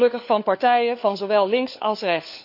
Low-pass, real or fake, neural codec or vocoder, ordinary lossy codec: 5.4 kHz; fake; codec, 16 kHz, 4 kbps, FunCodec, trained on LibriTTS, 50 frames a second; none